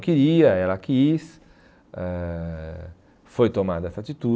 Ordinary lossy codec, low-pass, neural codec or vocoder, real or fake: none; none; none; real